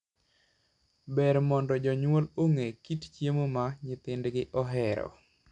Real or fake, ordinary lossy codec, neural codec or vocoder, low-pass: real; none; none; 10.8 kHz